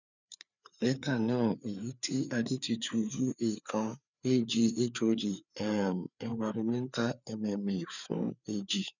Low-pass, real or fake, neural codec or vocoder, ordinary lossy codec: 7.2 kHz; fake; codec, 16 kHz, 4 kbps, FreqCodec, larger model; none